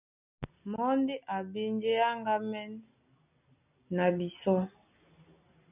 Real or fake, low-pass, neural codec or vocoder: real; 3.6 kHz; none